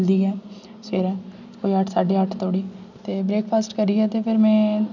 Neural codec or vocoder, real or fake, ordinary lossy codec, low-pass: none; real; none; 7.2 kHz